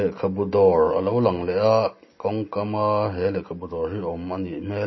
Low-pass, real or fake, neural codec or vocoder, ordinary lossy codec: 7.2 kHz; real; none; MP3, 24 kbps